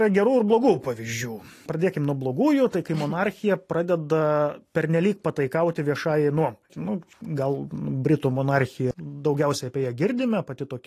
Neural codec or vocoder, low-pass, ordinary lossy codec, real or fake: none; 14.4 kHz; AAC, 48 kbps; real